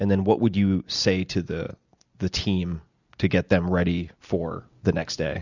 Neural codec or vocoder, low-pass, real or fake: none; 7.2 kHz; real